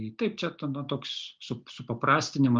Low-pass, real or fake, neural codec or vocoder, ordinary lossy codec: 7.2 kHz; real; none; Opus, 24 kbps